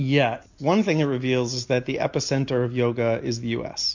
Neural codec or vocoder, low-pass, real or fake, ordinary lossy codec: none; 7.2 kHz; real; MP3, 48 kbps